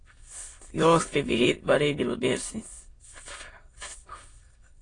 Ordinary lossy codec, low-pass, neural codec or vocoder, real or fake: AAC, 32 kbps; 9.9 kHz; autoencoder, 22.05 kHz, a latent of 192 numbers a frame, VITS, trained on many speakers; fake